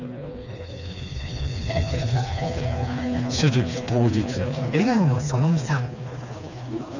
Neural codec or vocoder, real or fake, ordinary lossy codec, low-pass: codec, 16 kHz, 2 kbps, FreqCodec, smaller model; fake; none; 7.2 kHz